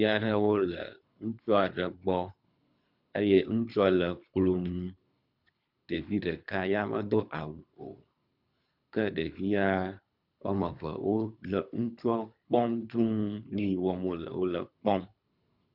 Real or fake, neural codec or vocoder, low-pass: fake; codec, 24 kHz, 3 kbps, HILCodec; 5.4 kHz